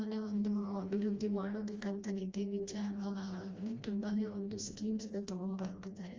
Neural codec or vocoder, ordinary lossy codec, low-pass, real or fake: codec, 16 kHz, 1 kbps, FreqCodec, smaller model; Opus, 32 kbps; 7.2 kHz; fake